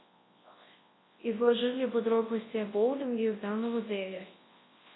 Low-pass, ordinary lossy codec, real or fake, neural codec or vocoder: 7.2 kHz; AAC, 16 kbps; fake; codec, 24 kHz, 0.9 kbps, WavTokenizer, large speech release